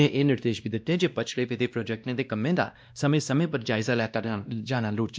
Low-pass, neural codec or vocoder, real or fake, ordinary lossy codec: none; codec, 16 kHz, 1 kbps, X-Codec, WavLM features, trained on Multilingual LibriSpeech; fake; none